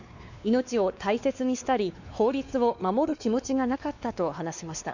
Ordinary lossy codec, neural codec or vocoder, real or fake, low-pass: none; codec, 16 kHz, 2 kbps, X-Codec, WavLM features, trained on Multilingual LibriSpeech; fake; 7.2 kHz